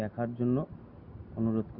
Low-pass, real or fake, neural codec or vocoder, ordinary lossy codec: 5.4 kHz; real; none; Opus, 64 kbps